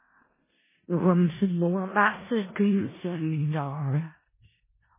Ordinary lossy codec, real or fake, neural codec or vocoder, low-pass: MP3, 16 kbps; fake; codec, 16 kHz in and 24 kHz out, 0.4 kbps, LongCat-Audio-Codec, four codebook decoder; 3.6 kHz